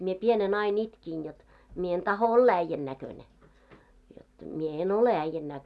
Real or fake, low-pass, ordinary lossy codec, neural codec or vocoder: real; none; none; none